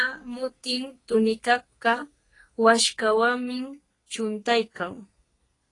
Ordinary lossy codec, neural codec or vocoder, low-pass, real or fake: AAC, 32 kbps; codec, 32 kHz, 1.9 kbps, SNAC; 10.8 kHz; fake